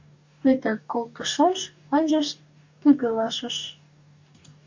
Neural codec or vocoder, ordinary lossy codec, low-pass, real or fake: codec, 44.1 kHz, 2.6 kbps, DAC; MP3, 48 kbps; 7.2 kHz; fake